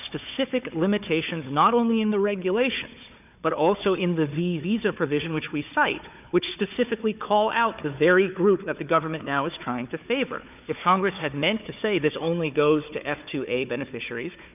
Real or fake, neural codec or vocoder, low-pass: fake; codec, 16 kHz, 4 kbps, FunCodec, trained on Chinese and English, 50 frames a second; 3.6 kHz